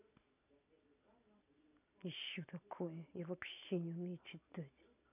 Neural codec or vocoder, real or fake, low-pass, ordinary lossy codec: none; real; 3.6 kHz; none